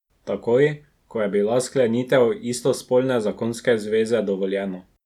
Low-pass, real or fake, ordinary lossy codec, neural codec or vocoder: 19.8 kHz; real; none; none